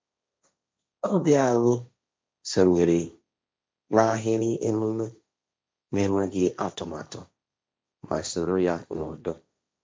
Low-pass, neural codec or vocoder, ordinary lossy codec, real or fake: none; codec, 16 kHz, 1.1 kbps, Voila-Tokenizer; none; fake